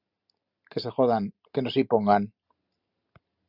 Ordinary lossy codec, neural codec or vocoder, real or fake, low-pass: Opus, 64 kbps; none; real; 5.4 kHz